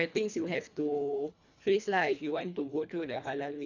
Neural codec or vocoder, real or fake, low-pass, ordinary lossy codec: codec, 24 kHz, 1.5 kbps, HILCodec; fake; 7.2 kHz; none